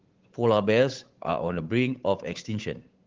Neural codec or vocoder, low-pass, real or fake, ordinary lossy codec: codec, 16 kHz, 8 kbps, FunCodec, trained on Chinese and English, 25 frames a second; 7.2 kHz; fake; Opus, 16 kbps